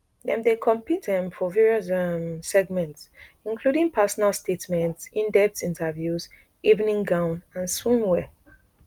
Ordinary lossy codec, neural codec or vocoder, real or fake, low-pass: Opus, 32 kbps; none; real; 19.8 kHz